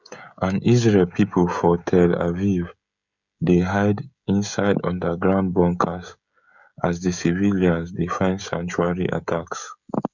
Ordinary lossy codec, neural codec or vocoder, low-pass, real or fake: none; codec, 16 kHz, 16 kbps, FreqCodec, smaller model; 7.2 kHz; fake